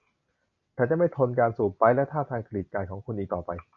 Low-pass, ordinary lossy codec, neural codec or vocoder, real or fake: 7.2 kHz; MP3, 48 kbps; none; real